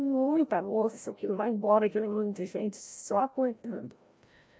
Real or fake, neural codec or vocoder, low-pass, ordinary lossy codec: fake; codec, 16 kHz, 0.5 kbps, FreqCodec, larger model; none; none